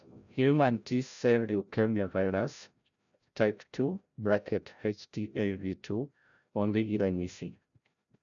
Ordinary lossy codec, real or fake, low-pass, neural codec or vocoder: none; fake; 7.2 kHz; codec, 16 kHz, 0.5 kbps, FreqCodec, larger model